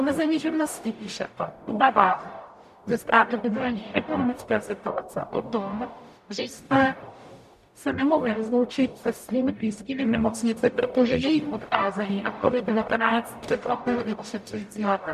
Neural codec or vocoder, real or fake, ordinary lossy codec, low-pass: codec, 44.1 kHz, 0.9 kbps, DAC; fake; MP3, 96 kbps; 14.4 kHz